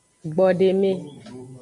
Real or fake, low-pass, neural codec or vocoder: real; 10.8 kHz; none